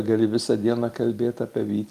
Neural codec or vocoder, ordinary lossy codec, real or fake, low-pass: none; Opus, 32 kbps; real; 14.4 kHz